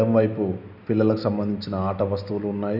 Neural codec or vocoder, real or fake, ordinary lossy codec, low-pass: none; real; none; 5.4 kHz